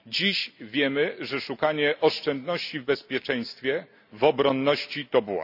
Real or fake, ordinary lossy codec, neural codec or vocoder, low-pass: real; none; none; 5.4 kHz